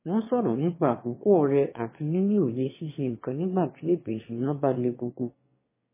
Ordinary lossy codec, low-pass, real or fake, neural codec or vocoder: MP3, 16 kbps; 3.6 kHz; fake; autoencoder, 22.05 kHz, a latent of 192 numbers a frame, VITS, trained on one speaker